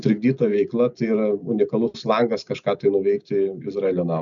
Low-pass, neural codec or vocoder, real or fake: 7.2 kHz; none; real